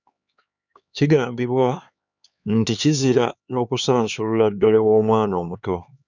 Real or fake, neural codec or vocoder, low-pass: fake; codec, 16 kHz, 4 kbps, X-Codec, HuBERT features, trained on LibriSpeech; 7.2 kHz